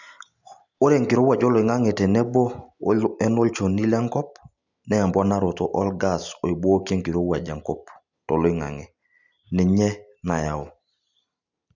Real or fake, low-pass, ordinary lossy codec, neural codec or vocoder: real; 7.2 kHz; none; none